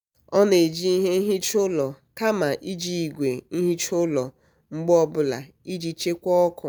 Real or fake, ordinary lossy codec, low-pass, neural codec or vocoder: real; none; none; none